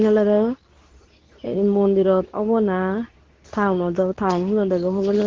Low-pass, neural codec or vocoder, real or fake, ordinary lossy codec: 7.2 kHz; codec, 24 kHz, 0.9 kbps, WavTokenizer, medium speech release version 2; fake; Opus, 16 kbps